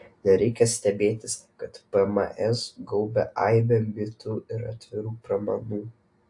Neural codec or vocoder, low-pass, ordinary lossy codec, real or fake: none; 10.8 kHz; AAC, 64 kbps; real